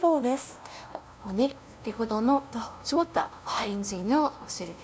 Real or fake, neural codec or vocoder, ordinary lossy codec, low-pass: fake; codec, 16 kHz, 0.5 kbps, FunCodec, trained on LibriTTS, 25 frames a second; none; none